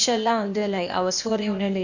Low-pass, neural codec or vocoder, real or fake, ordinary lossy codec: 7.2 kHz; codec, 16 kHz, 0.8 kbps, ZipCodec; fake; none